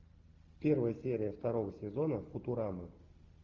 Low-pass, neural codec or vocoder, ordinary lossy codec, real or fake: 7.2 kHz; none; Opus, 32 kbps; real